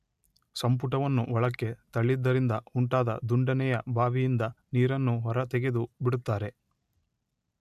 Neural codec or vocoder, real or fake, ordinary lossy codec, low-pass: none; real; none; 14.4 kHz